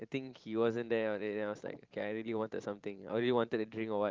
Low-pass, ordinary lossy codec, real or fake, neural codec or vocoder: 7.2 kHz; Opus, 24 kbps; fake; vocoder, 44.1 kHz, 128 mel bands every 512 samples, BigVGAN v2